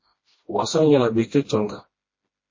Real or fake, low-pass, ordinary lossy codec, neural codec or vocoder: fake; 7.2 kHz; MP3, 32 kbps; codec, 16 kHz, 1 kbps, FreqCodec, smaller model